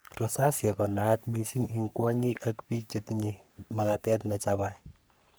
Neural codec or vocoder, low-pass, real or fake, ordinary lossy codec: codec, 44.1 kHz, 3.4 kbps, Pupu-Codec; none; fake; none